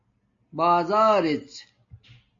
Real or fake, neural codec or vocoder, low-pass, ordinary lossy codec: real; none; 7.2 kHz; AAC, 48 kbps